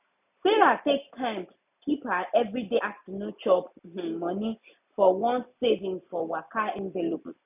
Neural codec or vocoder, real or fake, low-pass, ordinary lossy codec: none; real; 3.6 kHz; none